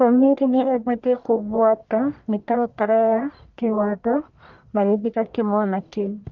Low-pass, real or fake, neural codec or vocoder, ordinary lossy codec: 7.2 kHz; fake; codec, 44.1 kHz, 1.7 kbps, Pupu-Codec; none